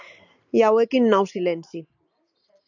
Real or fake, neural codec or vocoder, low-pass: real; none; 7.2 kHz